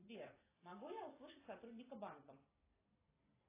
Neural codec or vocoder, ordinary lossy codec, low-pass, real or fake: vocoder, 44.1 kHz, 128 mel bands every 256 samples, BigVGAN v2; AAC, 16 kbps; 3.6 kHz; fake